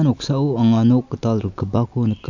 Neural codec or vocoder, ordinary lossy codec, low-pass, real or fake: none; none; 7.2 kHz; real